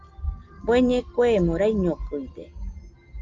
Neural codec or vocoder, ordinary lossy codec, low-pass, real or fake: none; Opus, 32 kbps; 7.2 kHz; real